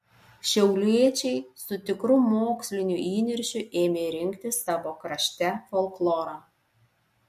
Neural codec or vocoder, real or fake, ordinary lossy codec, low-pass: none; real; MP3, 64 kbps; 14.4 kHz